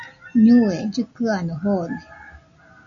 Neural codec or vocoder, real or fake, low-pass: none; real; 7.2 kHz